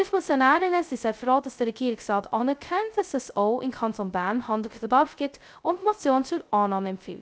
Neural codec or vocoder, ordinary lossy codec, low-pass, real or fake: codec, 16 kHz, 0.2 kbps, FocalCodec; none; none; fake